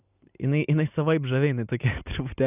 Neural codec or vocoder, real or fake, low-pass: none; real; 3.6 kHz